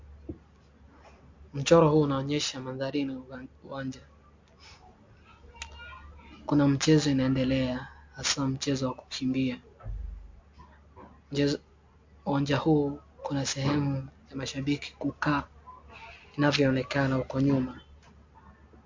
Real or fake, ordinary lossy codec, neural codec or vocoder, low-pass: real; MP3, 48 kbps; none; 7.2 kHz